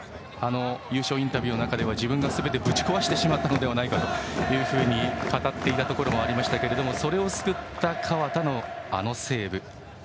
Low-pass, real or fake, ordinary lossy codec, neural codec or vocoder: none; real; none; none